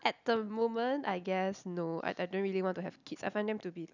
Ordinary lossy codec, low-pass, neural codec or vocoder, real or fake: none; 7.2 kHz; none; real